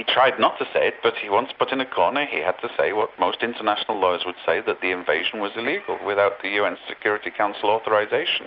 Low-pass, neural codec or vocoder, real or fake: 5.4 kHz; none; real